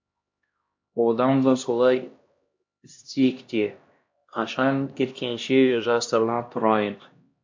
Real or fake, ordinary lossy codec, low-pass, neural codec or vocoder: fake; MP3, 48 kbps; 7.2 kHz; codec, 16 kHz, 1 kbps, X-Codec, HuBERT features, trained on LibriSpeech